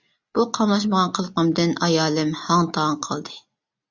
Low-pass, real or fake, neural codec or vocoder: 7.2 kHz; real; none